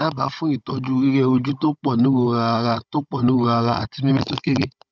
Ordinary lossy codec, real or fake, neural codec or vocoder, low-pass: none; fake; codec, 16 kHz, 16 kbps, FunCodec, trained on Chinese and English, 50 frames a second; none